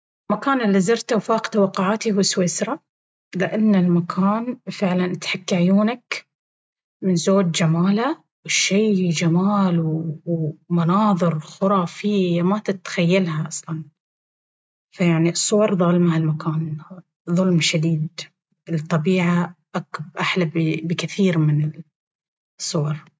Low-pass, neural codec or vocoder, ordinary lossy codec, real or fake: none; none; none; real